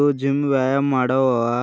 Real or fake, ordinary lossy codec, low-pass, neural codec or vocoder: real; none; none; none